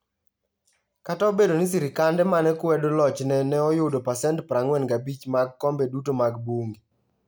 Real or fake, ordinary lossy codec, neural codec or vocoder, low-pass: real; none; none; none